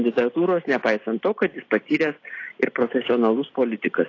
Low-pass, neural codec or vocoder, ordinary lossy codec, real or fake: 7.2 kHz; none; AAC, 32 kbps; real